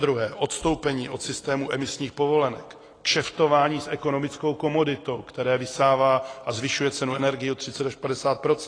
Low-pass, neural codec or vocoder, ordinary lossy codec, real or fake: 9.9 kHz; none; AAC, 32 kbps; real